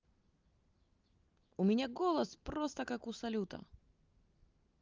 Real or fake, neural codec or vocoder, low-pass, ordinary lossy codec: real; none; 7.2 kHz; Opus, 32 kbps